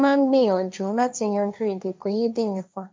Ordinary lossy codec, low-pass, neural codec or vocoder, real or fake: none; none; codec, 16 kHz, 1.1 kbps, Voila-Tokenizer; fake